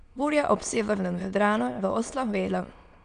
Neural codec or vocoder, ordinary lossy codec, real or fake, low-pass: autoencoder, 22.05 kHz, a latent of 192 numbers a frame, VITS, trained on many speakers; none; fake; 9.9 kHz